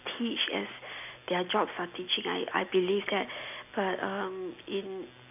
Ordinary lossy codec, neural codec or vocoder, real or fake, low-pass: none; none; real; 3.6 kHz